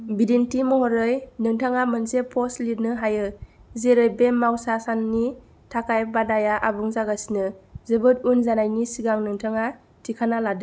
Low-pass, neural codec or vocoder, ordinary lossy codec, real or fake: none; none; none; real